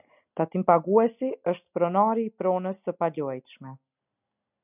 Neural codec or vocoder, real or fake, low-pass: none; real; 3.6 kHz